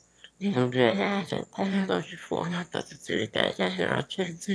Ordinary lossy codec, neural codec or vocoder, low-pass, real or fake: none; autoencoder, 22.05 kHz, a latent of 192 numbers a frame, VITS, trained on one speaker; none; fake